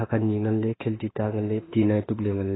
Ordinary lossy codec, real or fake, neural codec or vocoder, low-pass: AAC, 16 kbps; fake; autoencoder, 48 kHz, 32 numbers a frame, DAC-VAE, trained on Japanese speech; 7.2 kHz